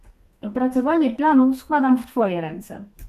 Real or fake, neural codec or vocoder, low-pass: fake; codec, 32 kHz, 1.9 kbps, SNAC; 14.4 kHz